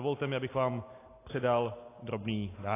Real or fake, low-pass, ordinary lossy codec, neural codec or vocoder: real; 3.6 kHz; AAC, 24 kbps; none